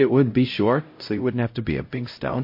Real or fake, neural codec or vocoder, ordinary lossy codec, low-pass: fake; codec, 16 kHz, 0.5 kbps, X-Codec, HuBERT features, trained on LibriSpeech; MP3, 32 kbps; 5.4 kHz